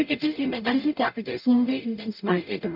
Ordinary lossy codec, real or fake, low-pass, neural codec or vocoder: none; fake; 5.4 kHz; codec, 44.1 kHz, 0.9 kbps, DAC